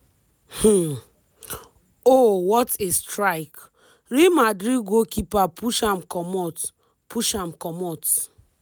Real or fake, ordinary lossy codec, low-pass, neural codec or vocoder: real; none; none; none